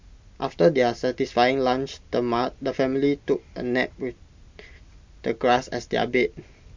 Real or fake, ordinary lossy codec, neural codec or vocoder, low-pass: real; MP3, 64 kbps; none; 7.2 kHz